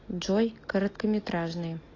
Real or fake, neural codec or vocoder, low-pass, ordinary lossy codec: real; none; 7.2 kHz; AAC, 32 kbps